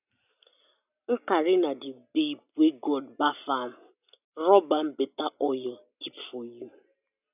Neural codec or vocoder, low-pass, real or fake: none; 3.6 kHz; real